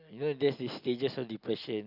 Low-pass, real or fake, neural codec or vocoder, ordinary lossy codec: 5.4 kHz; real; none; none